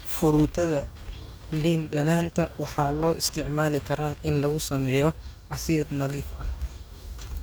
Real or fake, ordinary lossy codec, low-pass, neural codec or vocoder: fake; none; none; codec, 44.1 kHz, 2.6 kbps, DAC